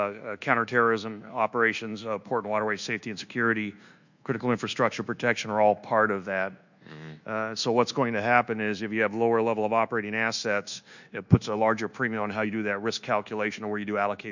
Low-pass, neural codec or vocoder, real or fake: 7.2 kHz; codec, 24 kHz, 1.2 kbps, DualCodec; fake